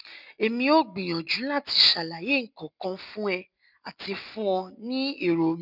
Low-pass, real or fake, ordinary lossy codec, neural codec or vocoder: 5.4 kHz; real; none; none